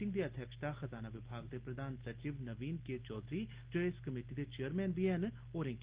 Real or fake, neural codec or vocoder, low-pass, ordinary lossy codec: real; none; 3.6 kHz; Opus, 16 kbps